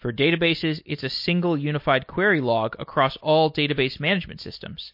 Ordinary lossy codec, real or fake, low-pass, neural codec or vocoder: MP3, 32 kbps; real; 5.4 kHz; none